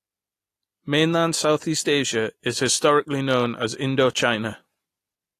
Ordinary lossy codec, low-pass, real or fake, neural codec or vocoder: AAC, 48 kbps; 14.4 kHz; real; none